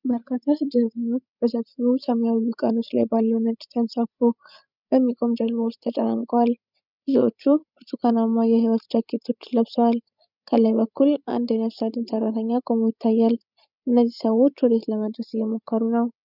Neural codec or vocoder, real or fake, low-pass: codec, 16 kHz, 16 kbps, FreqCodec, larger model; fake; 5.4 kHz